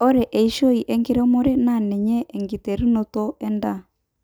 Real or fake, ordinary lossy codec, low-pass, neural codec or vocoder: real; none; none; none